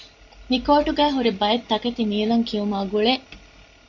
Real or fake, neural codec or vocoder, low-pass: real; none; 7.2 kHz